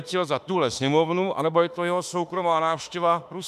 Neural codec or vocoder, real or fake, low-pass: autoencoder, 48 kHz, 32 numbers a frame, DAC-VAE, trained on Japanese speech; fake; 14.4 kHz